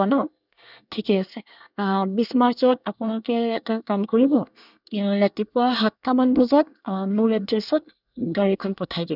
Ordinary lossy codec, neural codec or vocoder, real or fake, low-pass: none; codec, 24 kHz, 1 kbps, SNAC; fake; 5.4 kHz